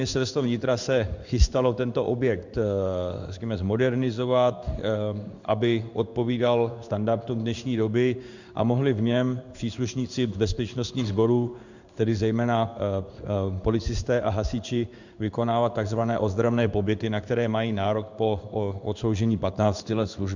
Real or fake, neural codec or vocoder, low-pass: fake; codec, 16 kHz in and 24 kHz out, 1 kbps, XY-Tokenizer; 7.2 kHz